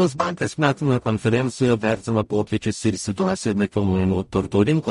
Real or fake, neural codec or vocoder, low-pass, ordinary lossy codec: fake; codec, 44.1 kHz, 0.9 kbps, DAC; 19.8 kHz; MP3, 48 kbps